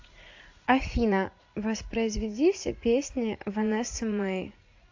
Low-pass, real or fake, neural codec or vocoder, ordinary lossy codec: 7.2 kHz; fake; vocoder, 44.1 kHz, 80 mel bands, Vocos; AAC, 48 kbps